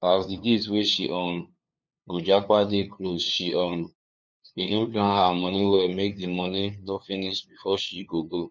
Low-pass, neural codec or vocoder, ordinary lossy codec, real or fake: none; codec, 16 kHz, 2 kbps, FunCodec, trained on LibriTTS, 25 frames a second; none; fake